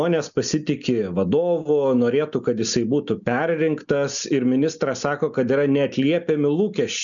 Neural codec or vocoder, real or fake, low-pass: none; real; 7.2 kHz